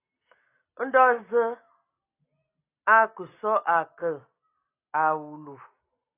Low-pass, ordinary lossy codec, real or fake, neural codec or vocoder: 3.6 kHz; AAC, 24 kbps; real; none